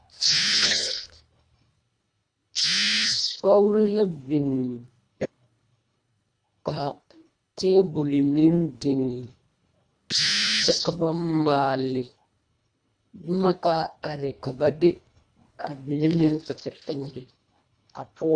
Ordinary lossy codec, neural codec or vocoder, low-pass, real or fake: AAC, 64 kbps; codec, 24 kHz, 1.5 kbps, HILCodec; 9.9 kHz; fake